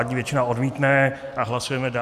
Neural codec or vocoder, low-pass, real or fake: none; 14.4 kHz; real